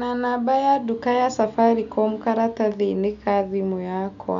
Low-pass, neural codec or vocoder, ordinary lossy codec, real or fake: 7.2 kHz; none; none; real